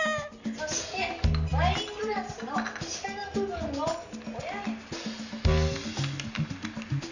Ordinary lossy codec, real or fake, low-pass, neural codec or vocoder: none; real; 7.2 kHz; none